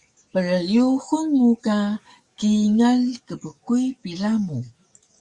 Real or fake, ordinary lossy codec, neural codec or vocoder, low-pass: fake; Opus, 64 kbps; codec, 44.1 kHz, 7.8 kbps, DAC; 10.8 kHz